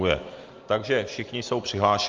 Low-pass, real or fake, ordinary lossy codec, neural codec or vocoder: 7.2 kHz; real; Opus, 32 kbps; none